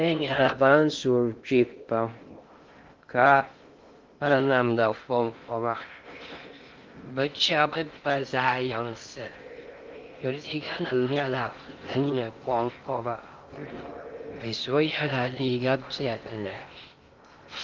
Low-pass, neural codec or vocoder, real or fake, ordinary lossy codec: 7.2 kHz; codec, 16 kHz in and 24 kHz out, 0.6 kbps, FocalCodec, streaming, 2048 codes; fake; Opus, 32 kbps